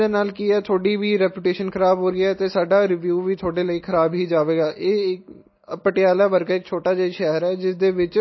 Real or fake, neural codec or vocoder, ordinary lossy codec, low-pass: real; none; MP3, 24 kbps; 7.2 kHz